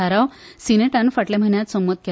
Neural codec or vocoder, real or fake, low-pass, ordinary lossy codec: none; real; none; none